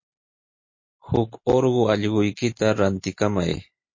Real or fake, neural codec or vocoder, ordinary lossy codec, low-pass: real; none; MP3, 32 kbps; 7.2 kHz